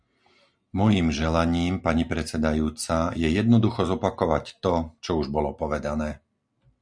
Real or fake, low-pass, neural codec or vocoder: real; 9.9 kHz; none